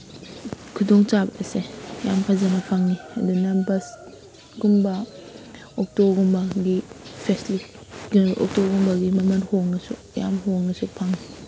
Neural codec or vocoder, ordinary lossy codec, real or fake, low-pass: none; none; real; none